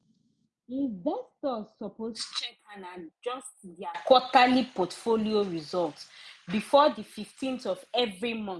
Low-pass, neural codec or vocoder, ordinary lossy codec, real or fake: none; none; none; real